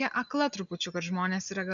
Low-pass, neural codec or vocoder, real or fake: 7.2 kHz; none; real